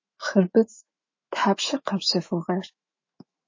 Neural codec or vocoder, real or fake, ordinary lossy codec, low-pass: autoencoder, 48 kHz, 128 numbers a frame, DAC-VAE, trained on Japanese speech; fake; MP3, 32 kbps; 7.2 kHz